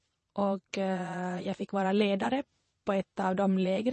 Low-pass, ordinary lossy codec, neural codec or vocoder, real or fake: 9.9 kHz; MP3, 32 kbps; vocoder, 22.05 kHz, 80 mel bands, Vocos; fake